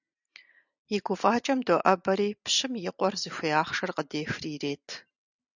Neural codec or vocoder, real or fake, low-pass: none; real; 7.2 kHz